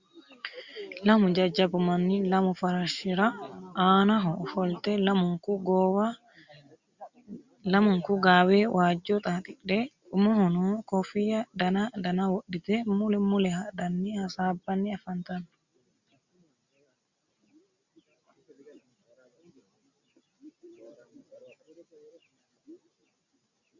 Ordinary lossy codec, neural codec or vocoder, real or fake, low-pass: Opus, 64 kbps; none; real; 7.2 kHz